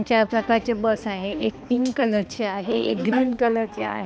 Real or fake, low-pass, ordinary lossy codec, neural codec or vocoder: fake; none; none; codec, 16 kHz, 2 kbps, X-Codec, HuBERT features, trained on balanced general audio